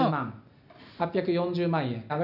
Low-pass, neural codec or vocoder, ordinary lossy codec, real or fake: 5.4 kHz; none; none; real